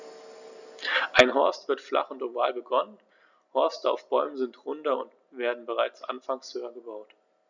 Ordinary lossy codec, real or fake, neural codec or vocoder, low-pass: none; real; none; 7.2 kHz